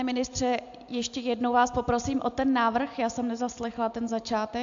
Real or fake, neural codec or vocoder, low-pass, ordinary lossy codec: real; none; 7.2 kHz; AAC, 64 kbps